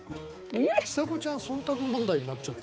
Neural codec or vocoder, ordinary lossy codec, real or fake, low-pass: codec, 16 kHz, 4 kbps, X-Codec, HuBERT features, trained on balanced general audio; none; fake; none